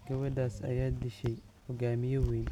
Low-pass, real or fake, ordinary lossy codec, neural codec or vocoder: 19.8 kHz; real; none; none